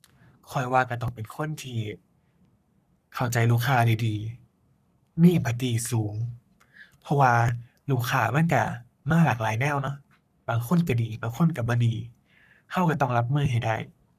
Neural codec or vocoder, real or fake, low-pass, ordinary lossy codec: codec, 44.1 kHz, 3.4 kbps, Pupu-Codec; fake; 14.4 kHz; none